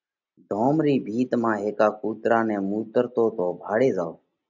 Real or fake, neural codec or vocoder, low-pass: real; none; 7.2 kHz